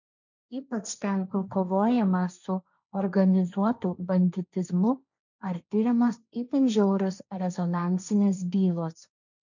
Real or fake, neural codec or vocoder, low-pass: fake; codec, 16 kHz, 1.1 kbps, Voila-Tokenizer; 7.2 kHz